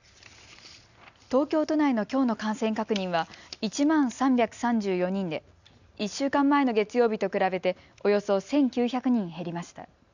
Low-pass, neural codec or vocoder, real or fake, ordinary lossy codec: 7.2 kHz; none; real; none